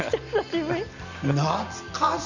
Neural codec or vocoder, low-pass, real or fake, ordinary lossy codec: none; 7.2 kHz; real; Opus, 64 kbps